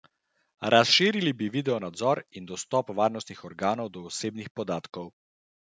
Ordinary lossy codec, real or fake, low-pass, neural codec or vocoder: none; real; none; none